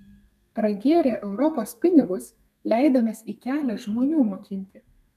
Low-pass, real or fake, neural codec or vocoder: 14.4 kHz; fake; codec, 32 kHz, 1.9 kbps, SNAC